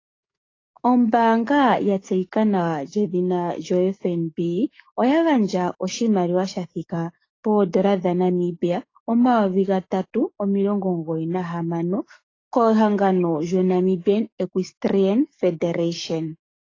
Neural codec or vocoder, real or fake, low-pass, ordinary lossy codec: codec, 44.1 kHz, 7.8 kbps, DAC; fake; 7.2 kHz; AAC, 32 kbps